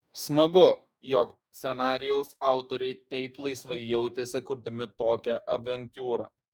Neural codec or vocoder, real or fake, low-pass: codec, 44.1 kHz, 2.6 kbps, DAC; fake; 19.8 kHz